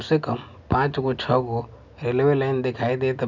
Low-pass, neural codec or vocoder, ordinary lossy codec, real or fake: 7.2 kHz; none; none; real